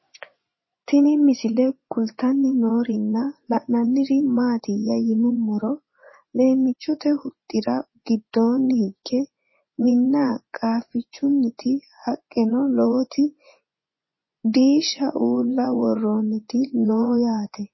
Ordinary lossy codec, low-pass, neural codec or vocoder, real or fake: MP3, 24 kbps; 7.2 kHz; vocoder, 24 kHz, 100 mel bands, Vocos; fake